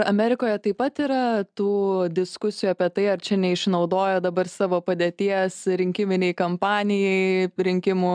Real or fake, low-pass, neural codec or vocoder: real; 9.9 kHz; none